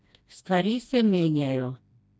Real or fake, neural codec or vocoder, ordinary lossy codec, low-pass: fake; codec, 16 kHz, 1 kbps, FreqCodec, smaller model; none; none